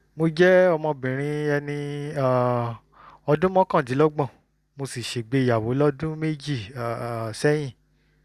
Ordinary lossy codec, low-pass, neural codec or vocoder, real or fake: Opus, 64 kbps; 14.4 kHz; none; real